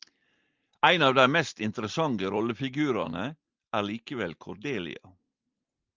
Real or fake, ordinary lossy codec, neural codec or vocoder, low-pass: real; Opus, 24 kbps; none; 7.2 kHz